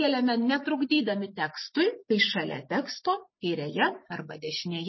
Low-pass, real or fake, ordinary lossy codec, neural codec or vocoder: 7.2 kHz; real; MP3, 24 kbps; none